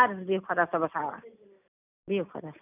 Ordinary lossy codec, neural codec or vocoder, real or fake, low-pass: none; none; real; 3.6 kHz